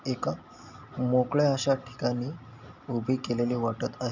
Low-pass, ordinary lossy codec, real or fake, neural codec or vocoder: 7.2 kHz; none; real; none